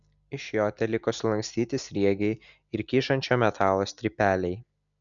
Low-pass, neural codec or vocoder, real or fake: 7.2 kHz; none; real